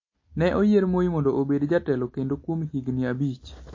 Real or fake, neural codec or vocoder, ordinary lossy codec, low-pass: real; none; MP3, 32 kbps; 7.2 kHz